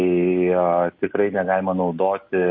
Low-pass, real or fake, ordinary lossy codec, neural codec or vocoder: 7.2 kHz; real; MP3, 32 kbps; none